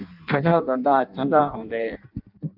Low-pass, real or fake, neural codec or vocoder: 5.4 kHz; fake; codec, 16 kHz in and 24 kHz out, 0.6 kbps, FireRedTTS-2 codec